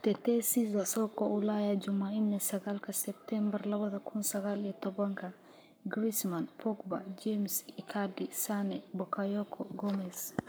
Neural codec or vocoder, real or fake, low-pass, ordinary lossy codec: codec, 44.1 kHz, 7.8 kbps, Pupu-Codec; fake; none; none